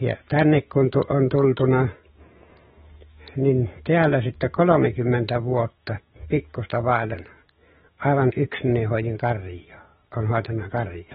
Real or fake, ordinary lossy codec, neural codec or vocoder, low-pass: fake; AAC, 16 kbps; autoencoder, 48 kHz, 128 numbers a frame, DAC-VAE, trained on Japanese speech; 19.8 kHz